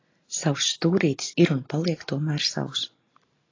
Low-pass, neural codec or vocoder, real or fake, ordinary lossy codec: 7.2 kHz; none; real; AAC, 32 kbps